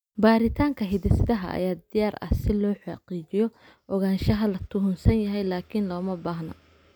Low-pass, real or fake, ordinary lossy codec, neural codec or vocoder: none; real; none; none